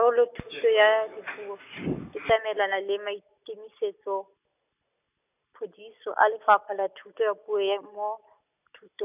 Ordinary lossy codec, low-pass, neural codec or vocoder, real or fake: none; 3.6 kHz; none; real